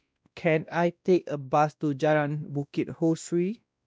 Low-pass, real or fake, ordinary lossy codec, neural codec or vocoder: none; fake; none; codec, 16 kHz, 1 kbps, X-Codec, WavLM features, trained on Multilingual LibriSpeech